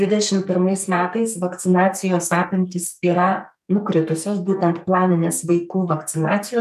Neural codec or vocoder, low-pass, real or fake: codec, 44.1 kHz, 2.6 kbps, SNAC; 14.4 kHz; fake